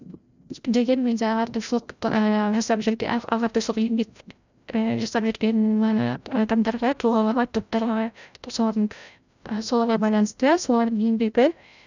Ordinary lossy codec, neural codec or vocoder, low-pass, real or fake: none; codec, 16 kHz, 0.5 kbps, FreqCodec, larger model; 7.2 kHz; fake